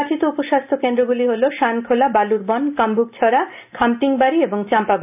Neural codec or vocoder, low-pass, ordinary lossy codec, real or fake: none; 3.6 kHz; none; real